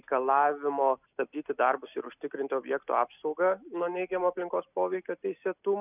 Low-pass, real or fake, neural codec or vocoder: 3.6 kHz; real; none